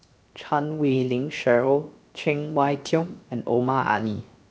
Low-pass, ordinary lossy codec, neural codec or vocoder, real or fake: none; none; codec, 16 kHz, 0.7 kbps, FocalCodec; fake